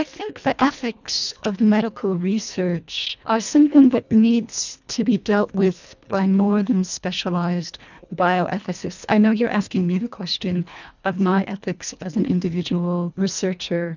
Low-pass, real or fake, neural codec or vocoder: 7.2 kHz; fake; codec, 24 kHz, 1.5 kbps, HILCodec